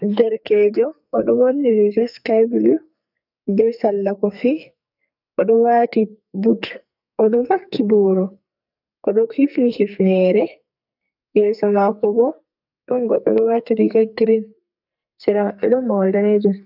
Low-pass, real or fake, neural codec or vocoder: 5.4 kHz; fake; codec, 44.1 kHz, 2.6 kbps, SNAC